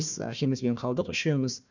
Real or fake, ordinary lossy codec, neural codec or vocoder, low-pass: fake; none; codec, 16 kHz, 1 kbps, FunCodec, trained on Chinese and English, 50 frames a second; 7.2 kHz